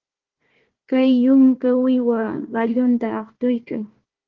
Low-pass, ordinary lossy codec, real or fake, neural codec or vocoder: 7.2 kHz; Opus, 16 kbps; fake; codec, 16 kHz, 1 kbps, FunCodec, trained on Chinese and English, 50 frames a second